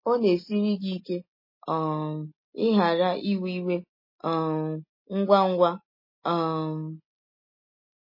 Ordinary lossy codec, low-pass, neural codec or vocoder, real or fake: MP3, 24 kbps; 5.4 kHz; none; real